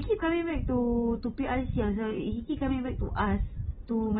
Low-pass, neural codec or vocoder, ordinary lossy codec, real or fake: 7.2 kHz; none; AAC, 16 kbps; real